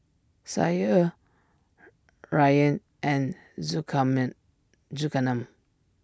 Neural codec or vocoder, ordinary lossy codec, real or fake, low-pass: none; none; real; none